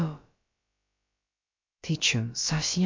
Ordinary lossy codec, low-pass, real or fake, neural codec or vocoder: MP3, 48 kbps; 7.2 kHz; fake; codec, 16 kHz, about 1 kbps, DyCAST, with the encoder's durations